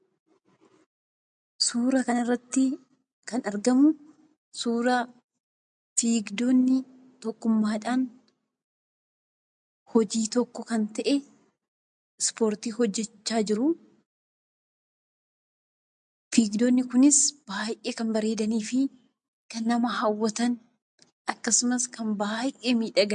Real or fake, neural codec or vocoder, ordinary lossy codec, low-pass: real; none; MP3, 64 kbps; 9.9 kHz